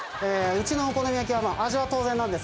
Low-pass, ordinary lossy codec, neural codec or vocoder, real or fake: none; none; none; real